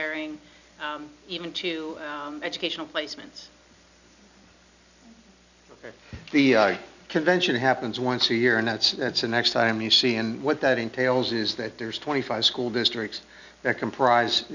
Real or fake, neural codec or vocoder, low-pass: real; none; 7.2 kHz